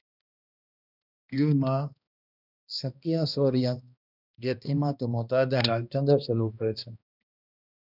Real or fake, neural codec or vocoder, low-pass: fake; codec, 16 kHz, 1 kbps, X-Codec, HuBERT features, trained on balanced general audio; 5.4 kHz